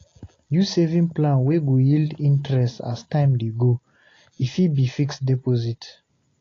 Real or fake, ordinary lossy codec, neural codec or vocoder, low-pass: real; AAC, 32 kbps; none; 7.2 kHz